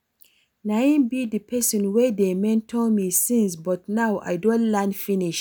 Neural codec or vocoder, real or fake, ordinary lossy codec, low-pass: none; real; none; none